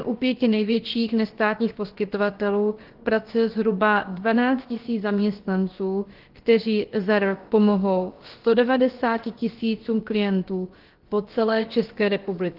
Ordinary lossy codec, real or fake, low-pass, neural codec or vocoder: Opus, 16 kbps; fake; 5.4 kHz; codec, 16 kHz, about 1 kbps, DyCAST, with the encoder's durations